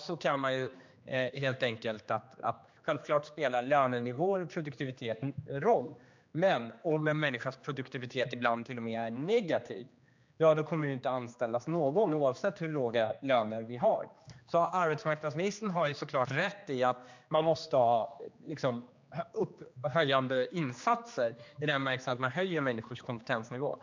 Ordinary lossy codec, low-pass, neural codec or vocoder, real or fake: MP3, 64 kbps; 7.2 kHz; codec, 16 kHz, 2 kbps, X-Codec, HuBERT features, trained on general audio; fake